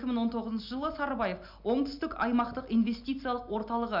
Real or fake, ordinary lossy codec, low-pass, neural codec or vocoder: real; none; 5.4 kHz; none